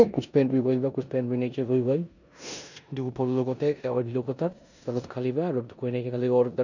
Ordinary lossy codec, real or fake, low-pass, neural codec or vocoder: none; fake; 7.2 kHz; codec, 16 kHz in and 24 kHz out, 0.9 kbps, LongCat-Audio-Codec, four codebook decoder